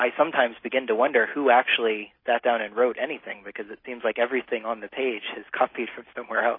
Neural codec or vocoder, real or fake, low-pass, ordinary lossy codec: none; real; 5.4 kHz; MP3, 24 kbps